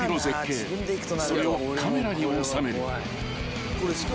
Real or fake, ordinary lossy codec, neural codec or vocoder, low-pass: real; none; none; none